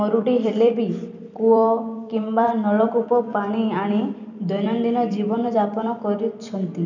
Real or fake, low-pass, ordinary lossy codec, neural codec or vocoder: fake; 7.2 kHz; none; vocoder, 44.1 kHz, 128 mel bands every 256 samples, BigVGAN v2